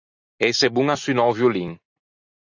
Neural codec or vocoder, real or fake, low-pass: none; real; 7.2 kHz